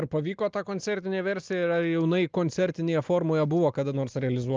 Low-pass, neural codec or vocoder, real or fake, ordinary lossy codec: 7.2 kHz; none; real; Opus, 24 kbps